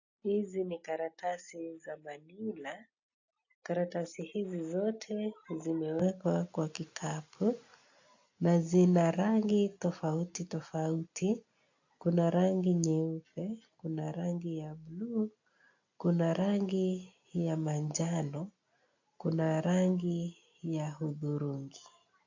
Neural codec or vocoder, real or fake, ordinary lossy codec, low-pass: none; real; AAC, 48 kbps; 7.2 kHz